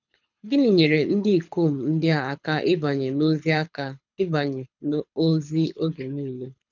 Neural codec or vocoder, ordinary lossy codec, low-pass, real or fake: codec, 24 kHz, 6 kbps, HILCodec; none; 7.2 kHz; fake